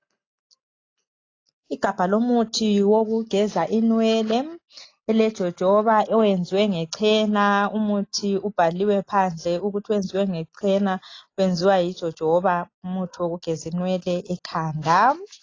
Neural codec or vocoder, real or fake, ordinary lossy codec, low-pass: none; real; AAC, 32 kbps; 7.2 kHz